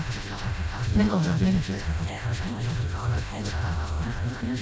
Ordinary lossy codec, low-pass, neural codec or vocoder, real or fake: none; none; codec, 16 kHz, 0.5 kbps, FreqCodec, smaller model; fake